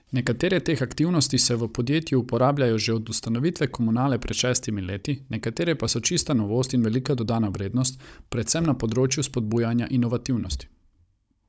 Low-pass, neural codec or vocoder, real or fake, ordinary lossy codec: none; codec, 16 kHz, 16 kbps, FunCodec, trained on Chinese and English, 50 frames a second; fake; none